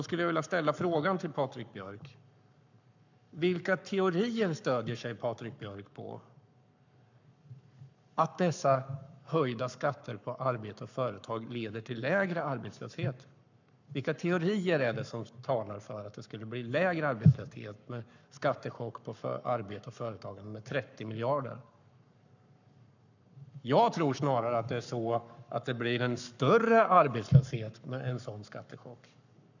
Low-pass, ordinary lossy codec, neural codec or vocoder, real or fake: 7.2 kHz; none; codec, 44.1 kHz, 7.8 kbps, Pupu-Codec; fake